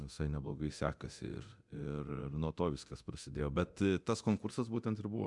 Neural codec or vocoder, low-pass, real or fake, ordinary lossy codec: codec, 24 kHz, 0.9 kbps, DualCodec; 10.8 kHz; fake; MP3, 96 kbps